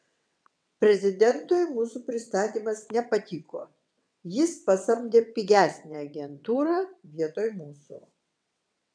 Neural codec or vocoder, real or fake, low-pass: vocoder, 22.05 kHz, 80 mel bands, WaveNeXt; fake; 9.9 kHz